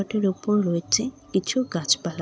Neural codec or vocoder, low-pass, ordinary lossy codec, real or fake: none; none; none; real